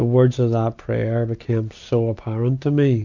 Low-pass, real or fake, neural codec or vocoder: 7.2 kHz; real; none